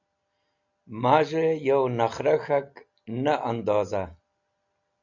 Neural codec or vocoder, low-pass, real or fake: none; 7.2 kHz; real